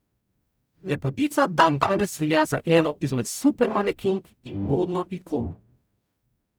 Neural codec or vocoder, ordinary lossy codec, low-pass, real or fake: codec, 44.1 kHz, 0.9 kbps, DAC; none; none; fake